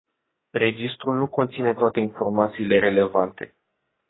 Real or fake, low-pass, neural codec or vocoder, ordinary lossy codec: fake; 7.2 kHz; codec, 44.1 kHz, 2.6 kbps, SNAC; AAC, 16 kbps